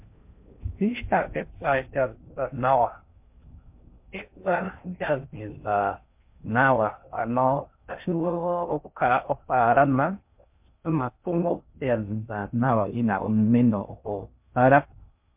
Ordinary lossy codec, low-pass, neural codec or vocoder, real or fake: MP3, 32 kbps; 3.6 kHz; codec, 16 kHz in and 24 kHz out, 0.6 kbps, FocalCodec, streaming, 4096 codes; fake